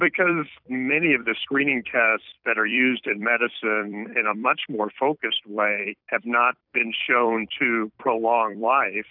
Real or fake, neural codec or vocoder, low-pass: fake; vocoder, 44.1 kHz, 128 mel bands every 256 samples, BigVGAN v2; 5.4 kHz